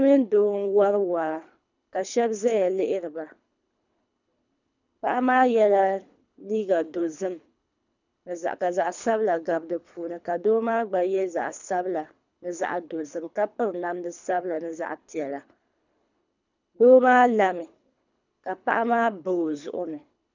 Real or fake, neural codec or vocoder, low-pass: fake; codec, 24 kHz, 3 kbps, HILCodec; 7.2 kHz